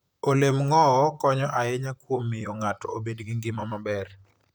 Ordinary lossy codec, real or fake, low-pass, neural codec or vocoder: none; fake; none; vocoder, 44.1 kHz, 128 mel bands, Pupu-Vocoder